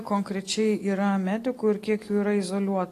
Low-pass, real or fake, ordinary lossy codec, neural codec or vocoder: 14.4 kHz; real; AAC, 48 kbps; none